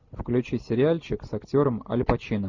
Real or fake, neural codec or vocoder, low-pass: real; none; 7.2 kHz